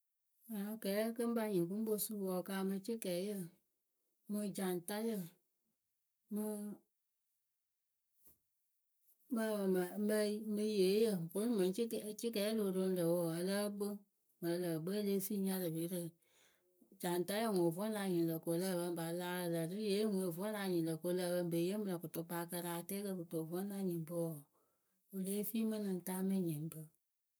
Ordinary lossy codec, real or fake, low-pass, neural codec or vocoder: none; fake; none; codec, 44.1 kHz, 7.8 kbps, Pupu-Codec